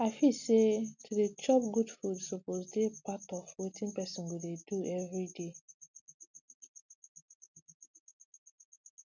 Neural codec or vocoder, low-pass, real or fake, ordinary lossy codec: none; 7.2 kHz; real; none